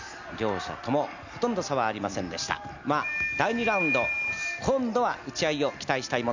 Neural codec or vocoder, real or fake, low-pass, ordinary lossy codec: none; real; 7.2 kHz; none